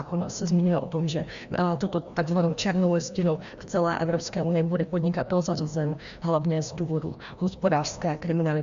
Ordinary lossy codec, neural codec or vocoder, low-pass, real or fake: Opus, 64 kbps; codec, 16 kHz, 1 kbps, FreqCodec, larger model; 7.2 kHz; fake